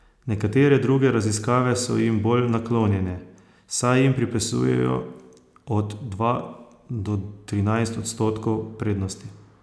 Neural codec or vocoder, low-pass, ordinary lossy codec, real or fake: none; none; none; real